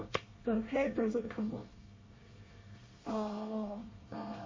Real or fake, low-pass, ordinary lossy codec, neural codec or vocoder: fake; 7.2 kHz; MP3, 32 kbps; codec, 24 kHz, 1 kbps, SNAC